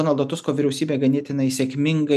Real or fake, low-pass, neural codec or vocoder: real; 14.4 kHz; none